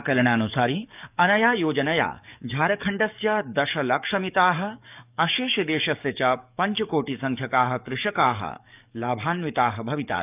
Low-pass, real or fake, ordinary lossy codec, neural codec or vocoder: 3.6 kHz; fake; none; codec, 44.1 kHz, 7.8 kbps, DAC